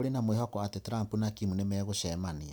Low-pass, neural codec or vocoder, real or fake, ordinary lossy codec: none; none; real; none